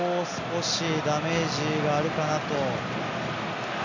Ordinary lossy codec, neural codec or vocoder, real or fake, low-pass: none; none; real; 7.2 kHz